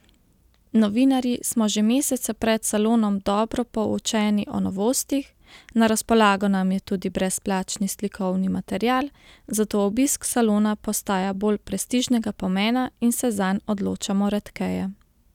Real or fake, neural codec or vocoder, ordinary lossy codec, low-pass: real; none; none; 19.8 kHz